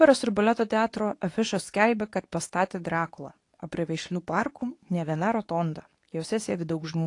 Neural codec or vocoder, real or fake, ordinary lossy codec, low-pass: codec, 24 kHz, 0.9 kbps, WavTokenizer, medium speech release version 2; fake; AAC, 48 kbps; 10.8 kHz